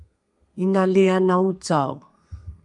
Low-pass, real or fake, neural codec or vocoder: 10.8 kHz; fake; codec, 32 kHz, 1.9 kbps, SNAC